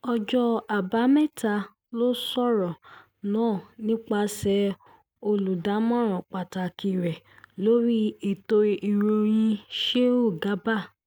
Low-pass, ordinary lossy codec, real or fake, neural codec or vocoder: none; none; real; none